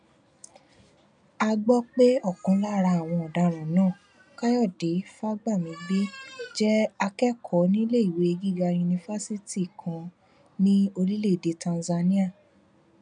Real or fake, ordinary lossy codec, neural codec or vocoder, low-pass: real; none; none; 9.9 kHz